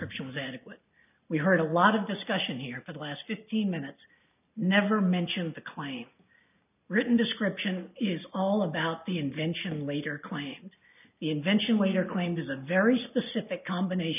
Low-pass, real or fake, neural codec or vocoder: 3.6 kHz; real; none